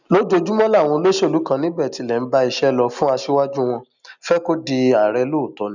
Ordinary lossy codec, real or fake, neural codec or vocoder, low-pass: none; real; none; 7.2 kHz